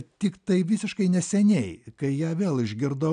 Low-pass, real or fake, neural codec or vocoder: 9.9 kHz; real; none